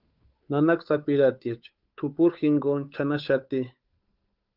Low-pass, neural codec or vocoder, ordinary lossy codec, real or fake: 5.4 kHz; codec, 16 kHz, 8 kbps, FunCodec, trained on Chinese and English, 25 frames a second; Opus, 24 kbps; fake